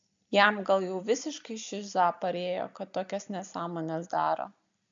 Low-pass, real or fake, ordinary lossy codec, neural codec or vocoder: 7.2 kHz; real; AAC, 64 kbps; none